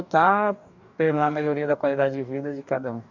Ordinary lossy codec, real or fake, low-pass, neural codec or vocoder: none; fake; 7.2 kHz; codec, 44.1 kHz, 2.6 kbps, DAC